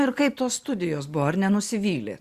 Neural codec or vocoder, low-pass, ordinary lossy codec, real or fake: none; 14.4 kHz; Opus, 64 kbps; real